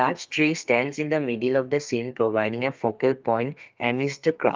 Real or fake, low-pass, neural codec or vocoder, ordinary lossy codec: fake; 7.2 kHz; codec, 32 kHz, 1.9 kbps, SNAC; Opus, 24 kbps